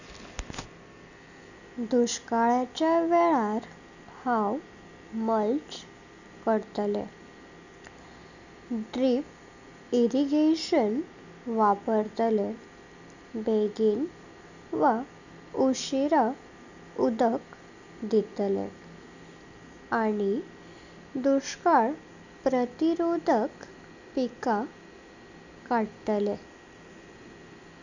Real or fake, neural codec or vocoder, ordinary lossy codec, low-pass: real; none; none; 7.2 kHz